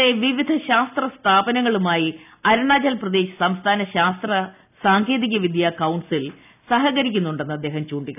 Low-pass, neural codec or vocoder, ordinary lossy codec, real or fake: 3.6 kHz; none; none; real